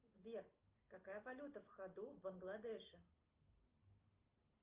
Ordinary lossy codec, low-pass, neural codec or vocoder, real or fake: Opus, 32 kbps; 3.6 kHz; none; real